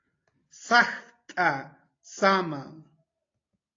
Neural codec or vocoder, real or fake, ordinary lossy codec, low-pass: none; real; AAC, 32 kbps; 7.2 kHz